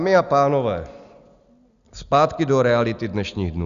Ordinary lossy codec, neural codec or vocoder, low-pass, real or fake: Opus, 64 kbps; none; 7.2 kHz; real